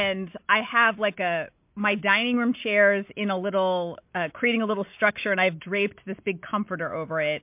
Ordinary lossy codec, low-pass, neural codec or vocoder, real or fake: MP3, 32 kbps; 3.6 kHz; none; real